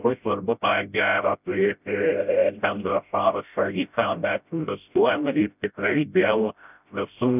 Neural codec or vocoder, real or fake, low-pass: codec, 16 kHz, 0.5 kbps, FreqCodec, smaller model; fake; 3.6 kHz